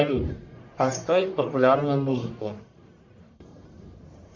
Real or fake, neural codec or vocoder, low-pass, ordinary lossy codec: fake; codec, 44.1 kHz, 1.7 kbps, Pupu-Codec; 7.2 kHz; MP3, 48 kbps